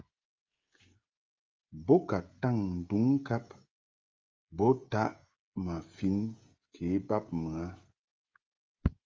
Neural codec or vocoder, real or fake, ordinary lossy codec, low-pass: codec, 16 kHz, 16 kbps, FreqCodec, smaller model; fake; Opus, 32 kbps; 7.2 kHz